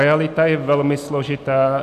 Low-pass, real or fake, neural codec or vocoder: 14.4 kHz; real; none